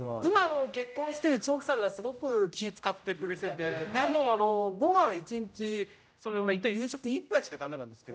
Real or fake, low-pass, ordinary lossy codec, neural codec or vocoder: fake; none; none; codec, 16 kHz, 0.5 kbps, X-Codec, HuBERT features, trained on general audio